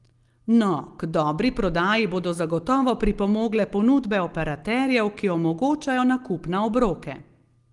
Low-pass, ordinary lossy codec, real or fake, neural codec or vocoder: 10.8 kHz; Opus, 24 kbps; real; none